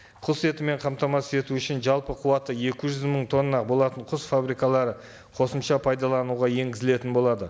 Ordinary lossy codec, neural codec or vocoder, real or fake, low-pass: none; none; real; none